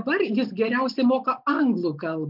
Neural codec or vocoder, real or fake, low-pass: none; real; 5.4 kHz